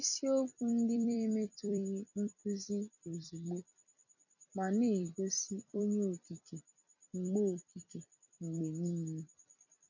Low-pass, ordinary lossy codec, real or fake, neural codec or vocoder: 7.2 kHz; none; fake; vocoder, 44.1 kHz, 128 mel bands every 256 samples, BigVGAN v2